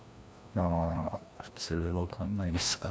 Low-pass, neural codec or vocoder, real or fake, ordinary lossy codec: none; codec, 16 kHz, 1 kbps, FreqCodec, larger model; fake; none